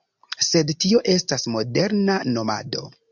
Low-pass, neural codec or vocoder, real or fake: 7.2 kHz; none; real